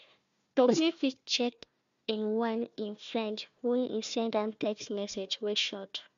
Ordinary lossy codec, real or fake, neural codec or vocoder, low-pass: MP3, 64 kbps; fake; codec, 16 kHz, 1 kbps, FunCodec, trained on Chinese and English, 50 frames a second; 7.2 kHz